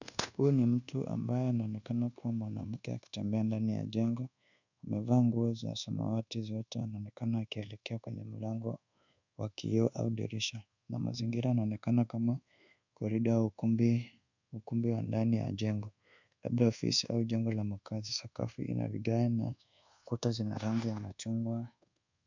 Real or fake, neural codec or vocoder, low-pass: fake; codec, 24 kHz, 1.2 kbps, DualCodec; 7.2 kHz